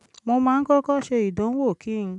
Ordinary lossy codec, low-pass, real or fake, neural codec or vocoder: none; 10.8 kHz; real; none